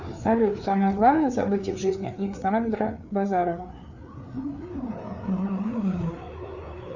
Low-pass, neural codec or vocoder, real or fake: 7.2 kHz; codec, 16 kHz, 4 kbps, FreqCodec, larger model; fake